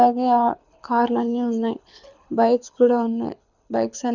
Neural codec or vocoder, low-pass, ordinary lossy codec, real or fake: codec, 24 kHz, 6 kbps, HILCodec; 7.2 kHz; none; fake